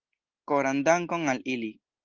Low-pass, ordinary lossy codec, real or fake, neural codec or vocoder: 7.2 kHz; Opus, 32 kbps; real; none